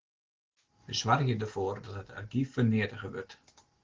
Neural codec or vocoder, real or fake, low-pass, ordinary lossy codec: none; real; 7.2 kHz; Opus, 16 kbps